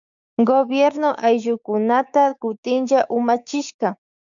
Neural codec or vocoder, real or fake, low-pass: codec, 16 kHz, 6 kbps, DAC; fake; 7.2 kHz